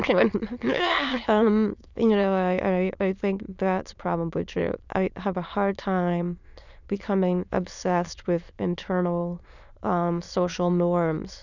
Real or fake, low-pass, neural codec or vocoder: fake; 7.2 kHz; autoencoder, 22.05 kHz, a latent of 192 numbers a frame, VITS, trained on many speakers